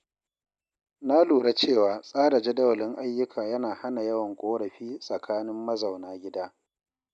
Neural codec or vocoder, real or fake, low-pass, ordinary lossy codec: none; real; 9.9 kHz; MP3, 96 kbps